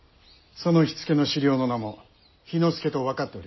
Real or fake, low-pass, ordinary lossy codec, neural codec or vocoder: real; 7.2 kHz; MP3, 24 kbps; none